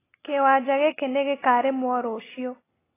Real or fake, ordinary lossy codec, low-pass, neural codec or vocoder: real; AAC, 16 kbps; 3.6 kHz; none